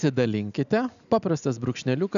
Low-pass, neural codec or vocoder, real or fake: 7.2 kHz; none; real